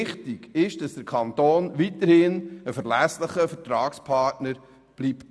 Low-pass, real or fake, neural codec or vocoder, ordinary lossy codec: none; real; none; none